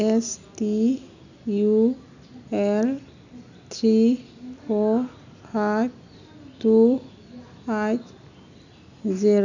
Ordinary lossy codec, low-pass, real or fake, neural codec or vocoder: none; 7.2 kHz; real; none